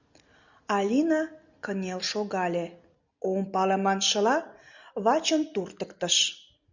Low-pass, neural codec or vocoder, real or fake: 7.2 kHz; none; real